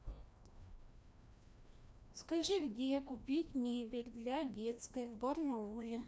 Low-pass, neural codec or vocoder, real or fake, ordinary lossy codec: none; codec, 16 kHz, 1 kbps, FreqCodec, larger model; fake; none